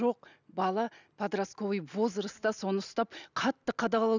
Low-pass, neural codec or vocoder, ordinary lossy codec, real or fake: 7.2 kHz; none; none; real